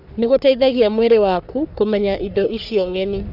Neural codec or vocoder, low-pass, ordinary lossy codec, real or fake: codec, 44.1 kHz, 3.4 kbps, Pupu-Codec; 5.4 kHz; none; fake